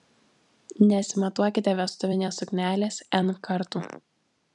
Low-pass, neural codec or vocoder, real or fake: 10.8 kHz; vocoder, 44.1 kHz, 128 mel bands every 256 samples, BigVGAN v2; fake